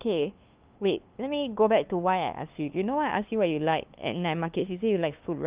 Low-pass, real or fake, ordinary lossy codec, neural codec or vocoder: 3.6 kHz; fake; Opus, 24 kbps; codec, 16 kHz, 2 kbps, FunCodec, trained on LibriTTS, 25 frames a second